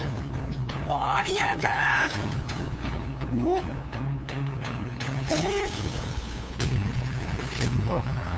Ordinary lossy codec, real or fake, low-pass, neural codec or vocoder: none; fake; none; codec, 16 kHz, 2 kbps, FunCodec, trained on LibriTTS, 25 frames a second